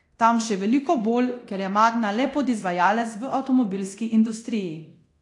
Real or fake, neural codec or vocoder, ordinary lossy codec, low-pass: fake; codec, 24 kHz, 0.9 kbps, DualCodec; AAC, 48 kbps; 10.8 kHz